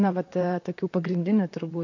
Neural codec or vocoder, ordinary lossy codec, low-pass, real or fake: vocoder, 44.1 kHz, 128 mel bands, Pupu-Vocoder; AAC, 48 kbps; 7.2 kHz; fake